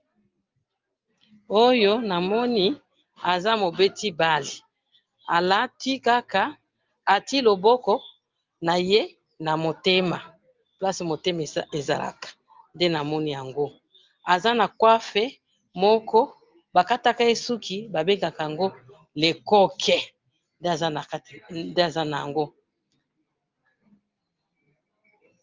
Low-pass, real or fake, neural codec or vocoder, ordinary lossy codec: 7.2 kHz; real; none; Opus, 24 kbps